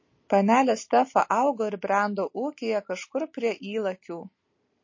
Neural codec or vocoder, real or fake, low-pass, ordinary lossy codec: none; real; 7.2 kHz; MP3, 32 kbps